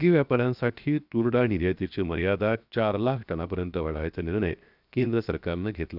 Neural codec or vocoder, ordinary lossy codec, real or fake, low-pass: codec, 16 kHz, 0.7 kbps, FocalCodec; none; fake; 5.4 kHz